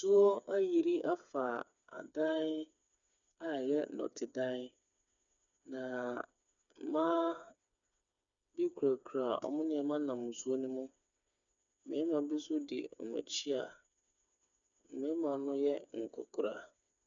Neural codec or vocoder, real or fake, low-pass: codec, 16 kHz, 4 kbps, FreqCodec, smaller model; fake; 7.2 kHz